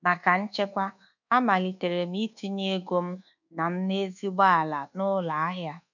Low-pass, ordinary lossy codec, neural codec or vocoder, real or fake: 7.2 kHz; none; codec, 24 kHz, 1.2 kbps, DualCodec; fake